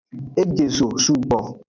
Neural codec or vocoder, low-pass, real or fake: none; 7.2 kHz; real